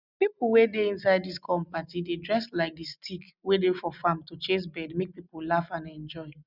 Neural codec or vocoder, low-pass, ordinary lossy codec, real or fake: none; 5.4 kHz; none; real